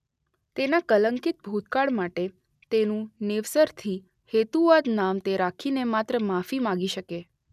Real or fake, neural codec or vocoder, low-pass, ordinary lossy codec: real; none; 14.4 kHz; none